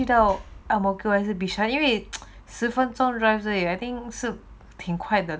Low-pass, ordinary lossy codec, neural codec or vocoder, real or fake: none; none; none; real